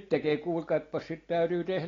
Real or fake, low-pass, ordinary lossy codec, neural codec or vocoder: real; 7.2 kHz; AAC, 32 kbps; none